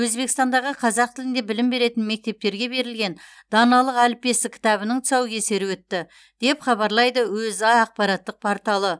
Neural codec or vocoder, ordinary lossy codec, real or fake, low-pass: none; none; real; none